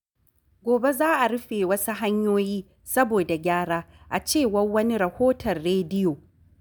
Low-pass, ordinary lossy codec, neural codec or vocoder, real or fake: none; none; none; real